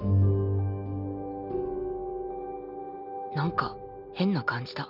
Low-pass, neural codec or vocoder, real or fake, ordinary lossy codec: 5.4 kHz; none; real; none